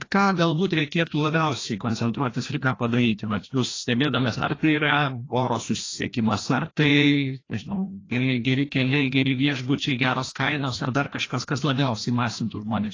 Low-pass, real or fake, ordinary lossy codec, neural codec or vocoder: 7.2 kHz; fake; AAC, 32 kbps; codec, 16 kHz, 1 kbps, FreqCodec, larger model